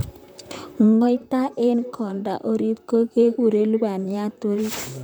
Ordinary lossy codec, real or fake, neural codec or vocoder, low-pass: none; fake; vocoder, 44.1 kHz, 128 mel bands, Pupu-Vocoder; none